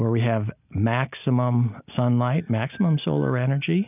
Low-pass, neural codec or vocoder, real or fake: 3.6 kHz; none; real